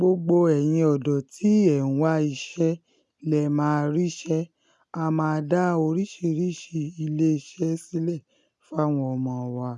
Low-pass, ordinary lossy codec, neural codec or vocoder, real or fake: none; none; none; real